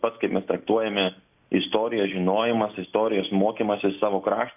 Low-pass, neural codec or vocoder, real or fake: 3.6 kHz; none; real